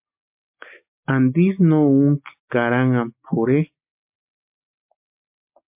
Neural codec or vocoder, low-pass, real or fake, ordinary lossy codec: none; 3.6 kHz; real; MP3, 32 kbps